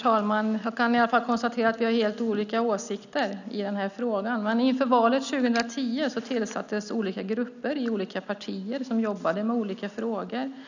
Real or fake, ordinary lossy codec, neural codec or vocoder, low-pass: real; none; none; 7.2 kHz